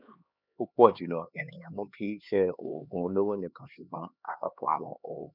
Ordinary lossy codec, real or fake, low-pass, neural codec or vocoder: none; fake; 5.4 kHz; codec, 16 kHz, 2 kbps, X-Codec, HuBERT features, trained on LibriSpeech